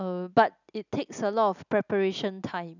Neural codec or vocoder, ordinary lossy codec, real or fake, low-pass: none; none; real; 7.2 kHz